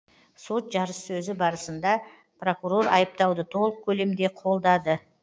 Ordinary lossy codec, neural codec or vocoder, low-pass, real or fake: none; codec, 16 kHz, 6 kbps, DAC; none; fake